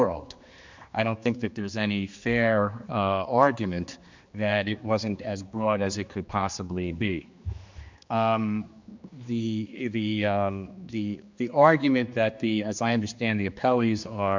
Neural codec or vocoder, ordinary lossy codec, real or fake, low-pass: codec, 16 kHz, 2 kbps, X-Codec, HuBERT features, trained on general audio; MP3, 64 kbps; fake; 7.2 kHz